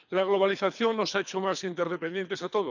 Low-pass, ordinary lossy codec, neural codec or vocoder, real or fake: 7.2 kHz; none; codec, 24 kHz, 3 kbps, HILCodec; fake